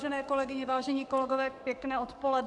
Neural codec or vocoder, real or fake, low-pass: codec, 44.1 kHz, 7.8 kbps, DAC; fake; 10.8 kHz